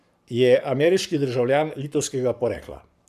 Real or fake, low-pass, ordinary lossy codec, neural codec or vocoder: fake; 14.4 kHz; none; codec, 44.1 kHz, 7.8 kbps, Pupu-Codec